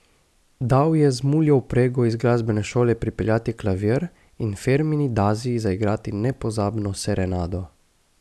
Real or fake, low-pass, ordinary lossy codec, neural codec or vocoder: real; none; none; none